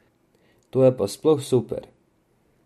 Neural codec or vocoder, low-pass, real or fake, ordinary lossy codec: none; 14.4 kHz; real; MP3, 64 kbps